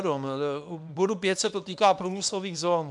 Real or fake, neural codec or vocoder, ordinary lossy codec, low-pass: fake; codec, 24 kHz, 0.9 kbps, WavTokenizer, small release; MP3, 96 kbps; 10.8 kHz